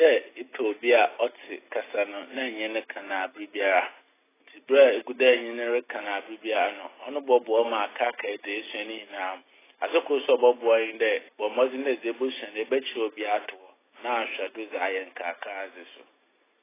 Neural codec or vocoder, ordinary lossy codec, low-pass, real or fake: none; AAC, 16 kbps; 3.6 kHz; real